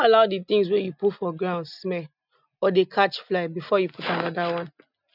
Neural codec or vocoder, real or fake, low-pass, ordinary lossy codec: none; real; 5.4 kHz; none